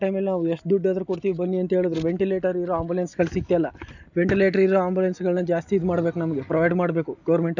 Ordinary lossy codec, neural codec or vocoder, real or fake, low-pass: none; none; real; 7.2 kHz